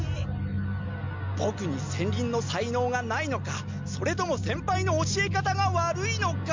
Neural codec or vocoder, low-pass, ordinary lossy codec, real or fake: none; 7.2 kHz; MP3, 64 kbps; real